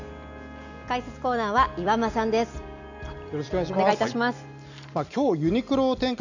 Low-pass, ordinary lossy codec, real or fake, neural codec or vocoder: 7.2 kHz; none; real; none